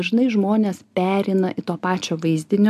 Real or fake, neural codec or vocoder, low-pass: real; none; 14.4 kHz